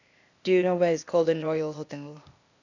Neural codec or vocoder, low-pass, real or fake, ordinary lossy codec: codec, 16 kHz, 0.8 kbps, ZipCodec; 7.2 kHz; fake; none